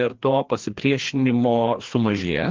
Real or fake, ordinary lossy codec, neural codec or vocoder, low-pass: fake; Opus, 16 kbps; codec, 16 kHz, 2 kbps, FreqCodec, larger model; 7.2 kHz